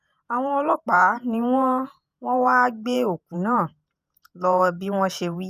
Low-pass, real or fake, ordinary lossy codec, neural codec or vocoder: 14.4 kHz; fake; none; vocoder, 48 kHz, 128 mel bands, Vocos